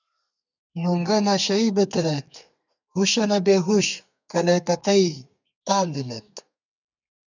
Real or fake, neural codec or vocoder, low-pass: fake; codec, 32 kHz, 1.9 kbps, SNAC; 7.2 kHz